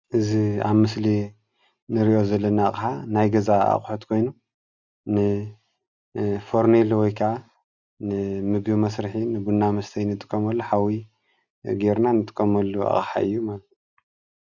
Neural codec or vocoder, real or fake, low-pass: none; real; 7.2 kHz